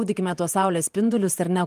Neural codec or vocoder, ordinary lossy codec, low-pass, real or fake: vocoder, 48 kHz, 128 mel bands, Vocos; Opus, 32 kbps; 14.4 kHz; fake